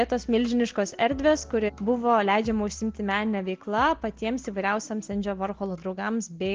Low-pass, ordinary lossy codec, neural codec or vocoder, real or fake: 7.2 kHz; Opus, 16 kbps; none; real